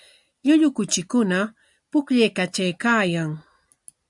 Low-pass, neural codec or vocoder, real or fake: 10.8 kHz; none; real